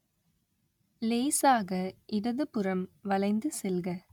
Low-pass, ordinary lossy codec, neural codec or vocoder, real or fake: 19.8 kHz; none; none; real